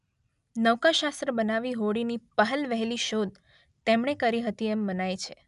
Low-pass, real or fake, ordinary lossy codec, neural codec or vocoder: 10.8 kHz; real; none; none